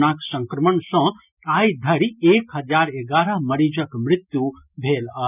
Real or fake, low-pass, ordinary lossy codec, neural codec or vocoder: real; 3.6 kHz; none; none